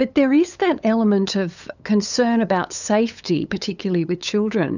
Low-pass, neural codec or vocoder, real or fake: 7.2 kHz; codec, 16 kHz, 8 kbps, FunCodec, trained on LibriTTS, 25 frames a second; fake